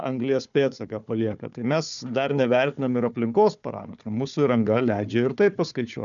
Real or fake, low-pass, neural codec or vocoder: fake; 7.2 kHz; codec, 16 kHz, 4 kbps, FunCodec, trained on Chinese and English, 50 frames a second